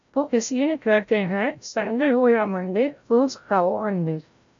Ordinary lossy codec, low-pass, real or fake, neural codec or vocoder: MP3, 64 kbps; 7.2 kHz; fake; codec, 16 kHz, 0.5 kbps, FreqCodec, larger model